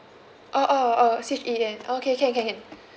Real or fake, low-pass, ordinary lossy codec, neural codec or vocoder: real; none; none; none